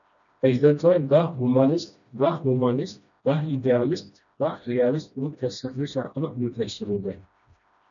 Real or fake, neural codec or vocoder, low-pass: fake; codec, 16 kHz, 1 kbps, FreqCodec, smaller model; 7.2 kHz